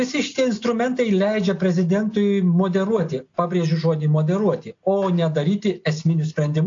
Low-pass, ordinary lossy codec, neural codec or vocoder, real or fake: 7.2 kHz; AAC, 48 kbps; none; real